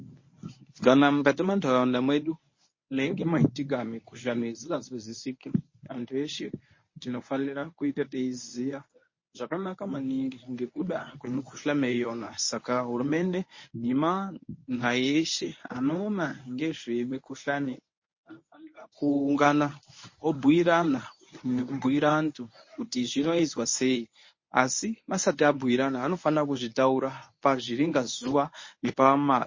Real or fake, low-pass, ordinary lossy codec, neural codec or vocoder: fake; 7.2 kHz; MP3, 32 kbps; codec, 24 kHz, 0.9 kbps, WavTokenizer, medium speech release version 1